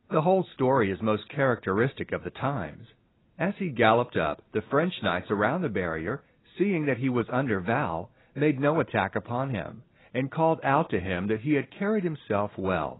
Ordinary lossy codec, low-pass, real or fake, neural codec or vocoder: AAC, 16 kbps; 7.2 kHz; real; none